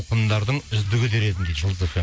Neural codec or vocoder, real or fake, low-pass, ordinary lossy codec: none; real; none; none